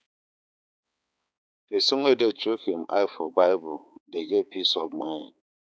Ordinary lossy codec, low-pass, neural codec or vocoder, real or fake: none; none; codec, 16 kHz, 4 kbps, X-Codec, HuBERT features, trained on balanced general audio; fake